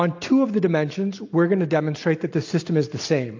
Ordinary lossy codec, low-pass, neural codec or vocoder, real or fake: AAC, 48 kbps; 7.2 kHz; none; real